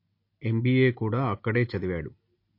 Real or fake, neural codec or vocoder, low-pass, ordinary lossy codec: real; none; 5.4 kHz; MP3, 32 kbps